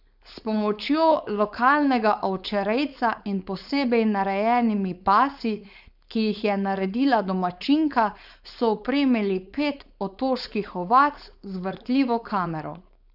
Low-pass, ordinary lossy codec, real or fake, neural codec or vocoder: 5.4 kHz; none; fake; codec, 16 kHz, 4.8 kbps, FACodec